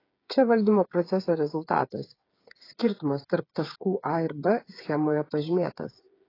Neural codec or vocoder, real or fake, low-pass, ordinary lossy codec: codec, 16 kHz, 8 kbps, FreqCodec, smaller model; fake; 5.4 kHz; AAC, 24 kbps